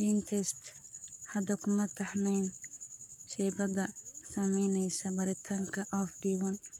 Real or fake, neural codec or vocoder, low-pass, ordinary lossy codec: fake; codec, 44.1 kHz, 7.8 kbps, Pupu-Codec; 19.8 kHz; none